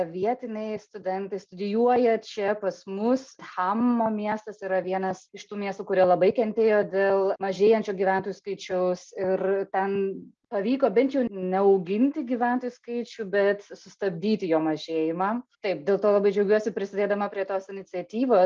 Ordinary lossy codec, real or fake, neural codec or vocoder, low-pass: Opus, 24 kbps; real; none; 7.2 kHz